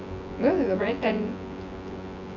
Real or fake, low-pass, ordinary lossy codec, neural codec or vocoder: fake; 7.2 kHz; none; vocoder, 24 kHz, 100 mel bands, Vocos